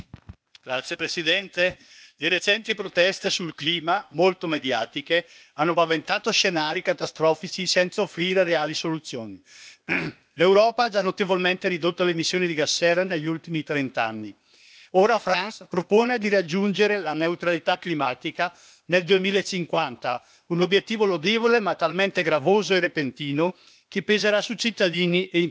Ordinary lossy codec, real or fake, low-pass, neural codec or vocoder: none; fake; none; codec, 16 kHz, 0.8 kbps, ZipCodec